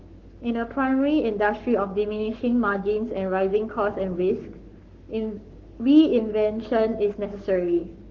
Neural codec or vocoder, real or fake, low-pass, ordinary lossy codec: codec, 44.1 kHz, 7.8 kbps, Pupu-Codec; fake; 7.2 kHz; Opus, 16 kbps